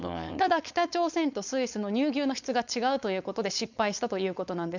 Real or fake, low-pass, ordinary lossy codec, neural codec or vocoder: fake; 7.2 kHz; none; codec, 16 kHz, 4.8 kbps, FACodec